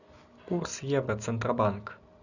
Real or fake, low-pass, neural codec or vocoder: real; 7.2 kHz; none